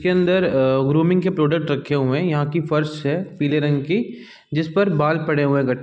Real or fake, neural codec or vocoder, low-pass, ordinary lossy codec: real; none; none; none